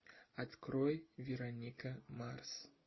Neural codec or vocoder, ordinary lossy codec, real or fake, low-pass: none; MP3, 24 kbps; real; 7.2 kHz